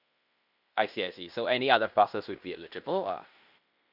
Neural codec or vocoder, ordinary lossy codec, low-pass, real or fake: codec, 16 kHz in and 24 kHz out, 0.9 kbps, LongCat-Audio-Codec, fine tuned four codebook decoder; none; 5.4 kHz; fake